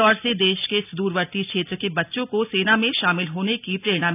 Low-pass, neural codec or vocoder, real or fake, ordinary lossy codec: 3.6 kHz; none; real; none